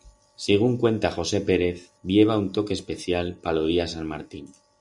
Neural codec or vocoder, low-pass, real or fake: none; 10.8 kHz; real